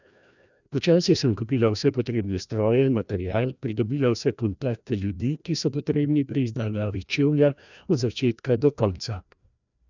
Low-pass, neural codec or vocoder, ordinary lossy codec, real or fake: 7.2 kHz; codec, 16 kHz, 1 kbps, FreqCodec, larger model; none; fake